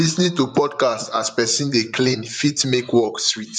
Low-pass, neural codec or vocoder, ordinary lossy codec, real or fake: 10.8 kHz; vocoder, 44.1 kHz, 128 mel bands, Pupu-Vocoder; none; fake